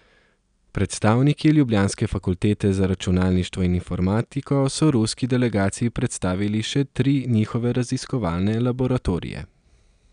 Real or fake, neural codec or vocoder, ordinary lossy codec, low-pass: real; none; none; 9.9 kHz